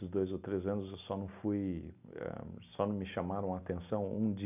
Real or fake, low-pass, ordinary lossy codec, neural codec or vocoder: real; 3.6 kHz; none; none